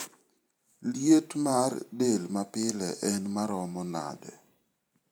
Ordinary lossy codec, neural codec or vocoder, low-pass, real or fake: none; vocoder, 44.1 kHz, 128 mel bands every 512 samples, BigVGAN v2; none; fake